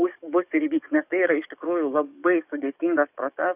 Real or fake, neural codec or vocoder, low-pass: fake; codec, 44.1 kHz, 7.8 kbps, DAC; 3.6 kHz